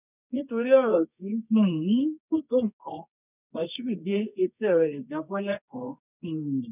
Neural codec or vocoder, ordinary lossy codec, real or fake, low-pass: codec, 24 kHz, 0.9 kbps, WavTokenizer, medium music audio release; none; fake; 3.6 kHz